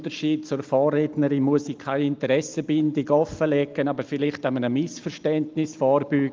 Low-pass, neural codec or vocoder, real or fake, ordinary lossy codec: 7.2 kHz; none; real; Opus, 32 kbps